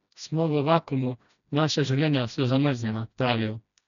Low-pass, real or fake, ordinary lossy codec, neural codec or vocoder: 7.2 kHz; fake; none; codec, 16 kHz, 1 kbps, FreqCodec, smaller model